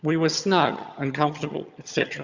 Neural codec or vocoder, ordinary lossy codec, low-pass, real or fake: vocoder, 22.05 kHz, 80 mel bands, HiFi-GAN; Opus, 64 kbps; 7.2 kHz; fake